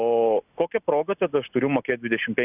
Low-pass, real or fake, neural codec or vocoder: 3.6 kHz; real; none